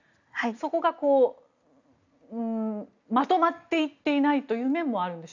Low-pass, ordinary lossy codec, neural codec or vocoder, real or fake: 7.2 kHz; none; none; real